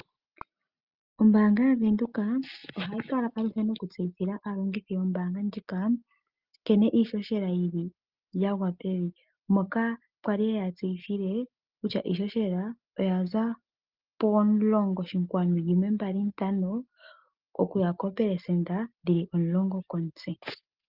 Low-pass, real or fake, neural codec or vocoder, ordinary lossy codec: 5.4 kHz; real; none; Opus, 24 kbps